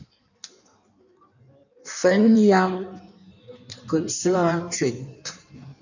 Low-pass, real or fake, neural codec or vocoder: 7.2 kHz; fake; codec, 16 kHz in and 24 kHz out, 1.1 kbps, FireRedTTS-2 codec